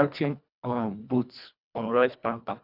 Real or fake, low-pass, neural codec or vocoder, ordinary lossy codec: fake; 5.4 kHz; codec, 24 kHz, 1.5 kbps, HILCodec; none